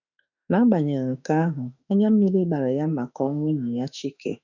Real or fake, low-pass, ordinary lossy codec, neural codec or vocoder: fake; 7.2 kHz; none; autoencoder, 48 kHz, 32 numbers a frame, DAC-VAE, trained on Japanese speech